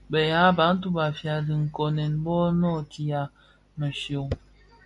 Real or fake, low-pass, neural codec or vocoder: real; 10.8 kHz; none